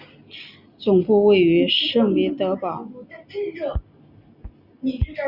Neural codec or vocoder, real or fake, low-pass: none; real; 5.4 kHz